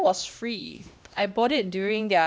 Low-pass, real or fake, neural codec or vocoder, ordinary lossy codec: none; fake; codec, 16 kHz, 1 kbps, X-Codec, HuBERT features, trained on LibriSpeech; none